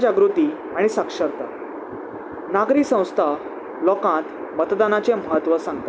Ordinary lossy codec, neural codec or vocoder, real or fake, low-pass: none; none; real; none